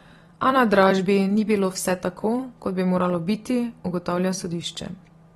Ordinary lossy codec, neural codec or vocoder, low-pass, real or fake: AAC, 32 kbps; vocoder, 44.1 kHz, 128 mel bands every 256 samples, BigVGAN v2; 19.8 kHz; fake